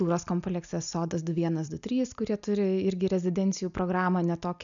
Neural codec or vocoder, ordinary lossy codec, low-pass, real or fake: none; MP3, 96 kbps; 7.2 kHz; real